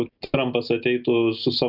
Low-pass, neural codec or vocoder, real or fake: 5.4 kHz; none; real